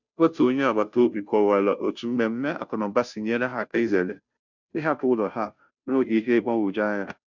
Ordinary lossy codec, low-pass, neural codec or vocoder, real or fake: none; 7.2 kHz; codec, 16 kHz, 0.5 kbps, FunCodec, trained on Chinese and English, 25 frames a second; fake